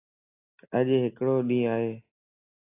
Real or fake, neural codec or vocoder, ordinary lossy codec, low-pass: real; none; AAC, 24 kbps; 3.6 kHz